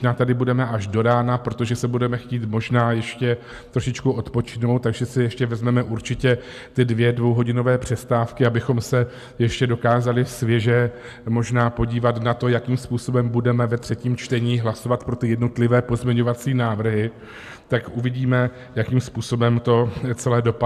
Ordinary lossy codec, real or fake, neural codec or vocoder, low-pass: AAC, 96 kbps; real; none; 14.4 kHz